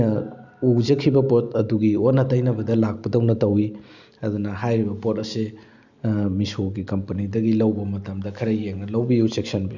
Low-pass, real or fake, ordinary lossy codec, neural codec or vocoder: 7.2 kHz; real; none; none